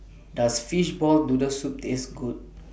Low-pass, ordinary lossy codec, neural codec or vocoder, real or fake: none; none; none; real